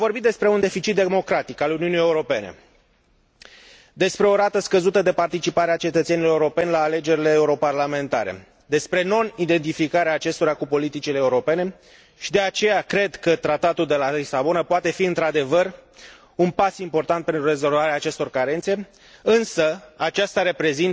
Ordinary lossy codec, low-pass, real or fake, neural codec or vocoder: none; none; real; none